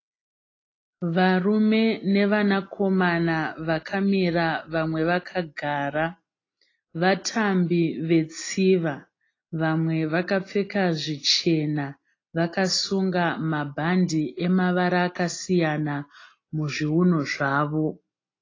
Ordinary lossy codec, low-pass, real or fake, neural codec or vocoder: AAC, 32 kbps; 7.2 kHz; real; none